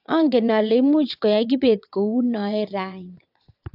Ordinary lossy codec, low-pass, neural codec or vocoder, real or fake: AAC, 48 kbps; 5.4 kHz; vocoder, 22.05 kHz, 80 mel bands, WaveNeXt; fake